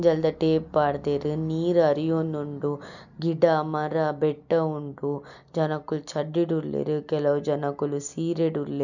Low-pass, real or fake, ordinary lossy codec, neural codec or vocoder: 7.2 kHz; real; none; none